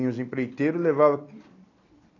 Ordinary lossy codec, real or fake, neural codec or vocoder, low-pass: AAC, 32 kbps; real; none; 7.2 kHz